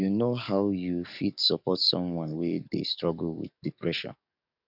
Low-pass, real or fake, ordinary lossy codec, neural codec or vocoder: 5.4 kHz; fake; none; codec, 44.1 kHz, 7.8 kbps, DAC